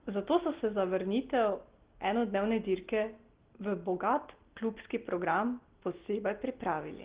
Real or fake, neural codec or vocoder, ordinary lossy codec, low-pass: real; none; Opus, 16 kbps; 3.6 kHz